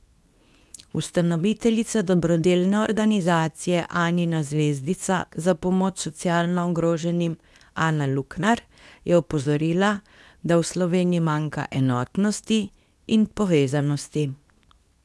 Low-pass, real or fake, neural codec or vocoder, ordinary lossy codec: none; fake; codec, 24 kHz, 0.9 kbps, WavTokenizer, medium speech release version 2; none